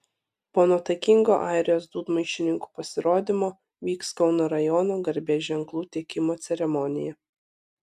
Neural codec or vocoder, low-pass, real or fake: none; 14.4 kHz; real